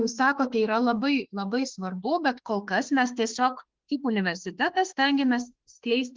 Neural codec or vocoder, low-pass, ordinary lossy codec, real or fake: autoencoder, 48 kHz, 32 numbers a frame, DAC-VAE, trained on Japanese speech; 7.2 kHz; Opus, 16 kbps; fake